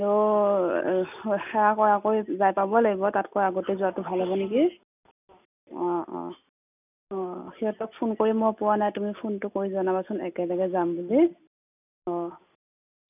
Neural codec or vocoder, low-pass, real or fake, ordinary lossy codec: none; 3.6 kHz; real; none